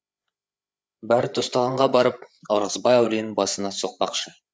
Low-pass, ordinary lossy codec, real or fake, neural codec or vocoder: none; none; fake; codec, 16 kHz, 8 kbps, FreqCodec, larger model